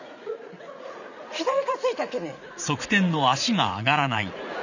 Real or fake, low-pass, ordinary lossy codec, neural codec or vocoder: fake; 7.2 kHz; none; vocoder, 44.1 kHz, 80 mel bands, Vocos